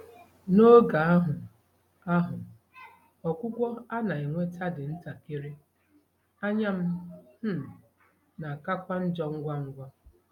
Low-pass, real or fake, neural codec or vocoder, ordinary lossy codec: 19.8 kHz; real; none; none